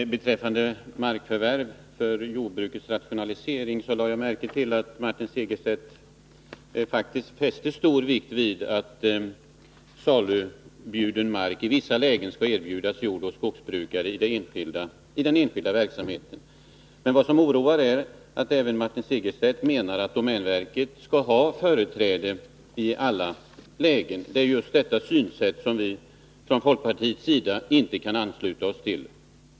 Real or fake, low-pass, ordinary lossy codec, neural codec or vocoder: real; none; none; none